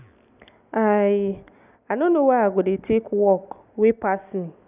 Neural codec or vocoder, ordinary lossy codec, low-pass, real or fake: none; none; 3.6 kHz; real